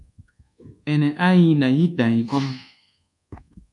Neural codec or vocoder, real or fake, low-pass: codec, 24 kHz, 1.2 kbps, DualCodec; fake; 10.8 kHz